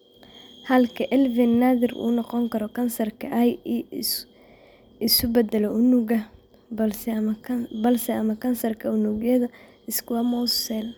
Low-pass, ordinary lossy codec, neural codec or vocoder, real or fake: none; none; none; real